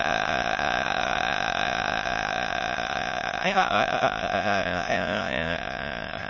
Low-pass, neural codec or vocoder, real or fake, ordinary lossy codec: 7.2 kHz; autoencoder, 22.05 kHz, a latent of 192 numbers a frame, VITS, trained on many speakers; fake; MP3, 32 kbps